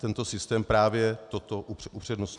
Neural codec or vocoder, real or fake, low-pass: none; real; 10.8 kHz